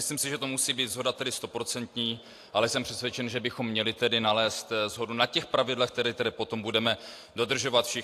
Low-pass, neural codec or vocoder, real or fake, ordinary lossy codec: 14.4 kHz; none; real; AAC, 64 kbps